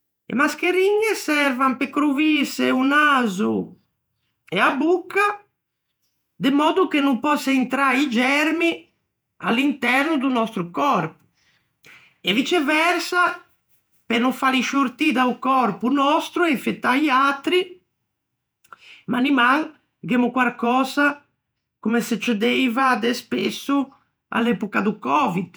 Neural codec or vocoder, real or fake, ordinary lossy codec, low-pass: vocoder, 48 kHz, 128 mel bands, Vocos; fake; none; none